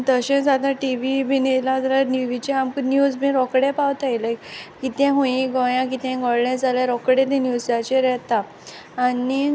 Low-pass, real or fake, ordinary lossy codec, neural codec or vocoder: none; real; none; none